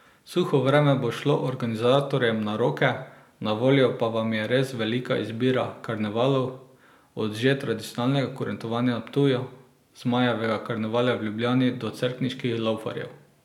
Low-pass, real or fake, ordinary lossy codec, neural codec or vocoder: 19.8 kHz; real; none; none